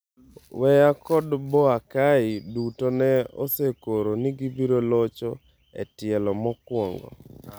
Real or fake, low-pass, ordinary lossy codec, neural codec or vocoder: real; none; none; none